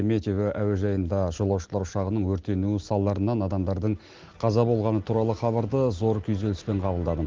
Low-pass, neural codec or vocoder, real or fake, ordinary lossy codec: 7.2 kHz; none; real; Opus, 16 kbps